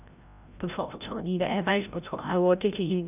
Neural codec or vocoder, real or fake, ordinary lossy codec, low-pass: codec, 16 kHz, 0.5 kbps, FreqCodec, larger model; fake; none; 3.6 kHz